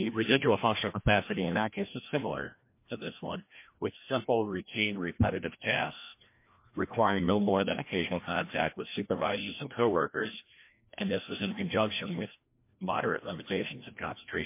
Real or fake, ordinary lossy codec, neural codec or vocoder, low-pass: fake; MP3, 24 kbps; codec, 16 kHz, 1 kbps, FreqCodec, larger model; 3.6 kHz